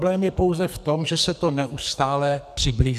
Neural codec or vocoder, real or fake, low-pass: codec, 44.1 kHz, 2.6 kbps, SNAC; fake; 14.4 kHz